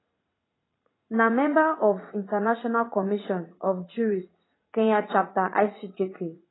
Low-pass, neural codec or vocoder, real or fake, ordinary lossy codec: 7.2 kHz; vocoder, 44.1 kHz, 80 mel bands, Vocos; fake; AAC, 16 kbps